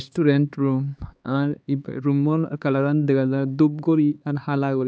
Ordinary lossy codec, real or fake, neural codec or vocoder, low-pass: none; fake; codec, 16 kHz, 4 kbps, X-Codec, HuBERT features, trained on LibriSpeech; none